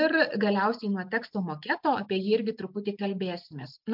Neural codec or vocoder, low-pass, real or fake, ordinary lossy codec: none; 5.4 kHz; real; MP3, 48 kbps